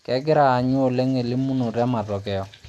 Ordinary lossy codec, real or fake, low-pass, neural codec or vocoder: none; real; none; none